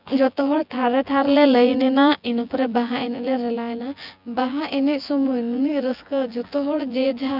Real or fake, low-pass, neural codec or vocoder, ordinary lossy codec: fake; 5.4 kHz; vocoder, 24 kHz, 100 mel bands, Vocos; none